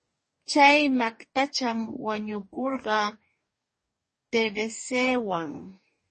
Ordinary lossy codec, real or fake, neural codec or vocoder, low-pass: MP3, 32 kbps; fake; codec, 44.1 kHz, 2.6 kbps, DAC; 10.8 kHz